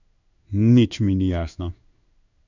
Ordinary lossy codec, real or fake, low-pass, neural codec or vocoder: none; fake; 7.2 kHz; codec, 16 kHz in and 24 kHz out, 1 kbps, XY-Tokenizer